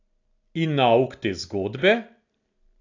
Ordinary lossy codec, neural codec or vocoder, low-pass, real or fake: AAC, 48 kbps; none; 7.2 kHz; real